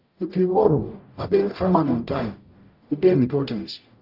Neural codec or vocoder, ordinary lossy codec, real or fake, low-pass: codec, 44.1 kHz, 0.9 kbps, DAC; Opus, 24 kbps; fake; 5.4 kHz